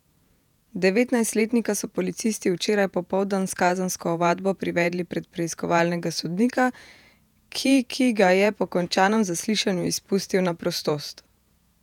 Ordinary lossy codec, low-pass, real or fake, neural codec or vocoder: none; 19.8 kHz; fake; vocoder, 48 kHz, 128 mel bands, Vocos